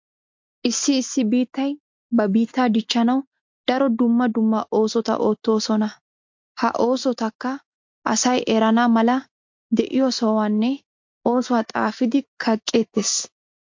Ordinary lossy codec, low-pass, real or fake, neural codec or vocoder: MP3, 48 kbps; 7.2 kHz; real; none